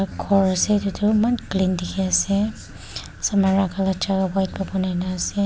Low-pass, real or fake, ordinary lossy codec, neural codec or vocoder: none; real; none; none